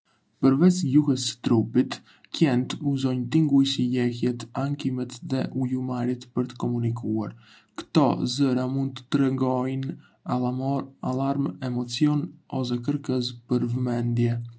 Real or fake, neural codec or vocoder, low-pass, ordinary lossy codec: real; none; none; none